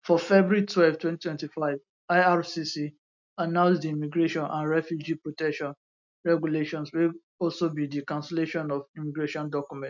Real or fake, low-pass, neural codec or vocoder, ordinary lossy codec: real; 7.2 kHz; none; none